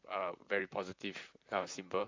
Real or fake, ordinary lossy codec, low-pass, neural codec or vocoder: fake; MP3, 64 kbps; 7.2 kHz; vocoder, 44.1 kHz, 128 mel bands, Pupu-Vocoder